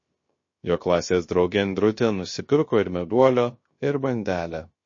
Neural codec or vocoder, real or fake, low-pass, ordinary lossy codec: codec, 16 kHz, 0.3 kbps, FocalCodec; fake; 7.2 kHz; MP3, 32 kbps